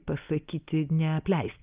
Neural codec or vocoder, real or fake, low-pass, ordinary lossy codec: none; real; 3.6 kHz; Opus, 24 kbps